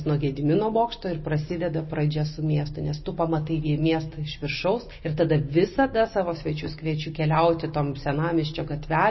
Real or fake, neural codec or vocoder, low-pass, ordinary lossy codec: real; none; 7.2 kHz; MP3, 24 kbps